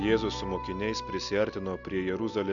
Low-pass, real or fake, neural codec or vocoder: 7.2 kHz; real; none